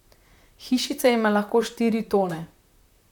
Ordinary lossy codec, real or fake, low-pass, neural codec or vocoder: none; fake; 19.8 kHz; vocoder, 44.1 kHz, 128 mel bands, Pupu-Vocoder